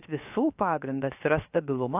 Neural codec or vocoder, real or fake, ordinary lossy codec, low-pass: codec, 16 kHz, 0.7 kbps, FocalCodec; fake; AAC, 24 kbps; 3.6 kHz